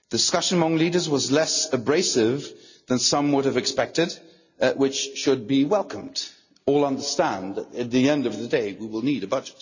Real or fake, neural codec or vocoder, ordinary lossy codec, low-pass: real; none; none; 7.2 kHz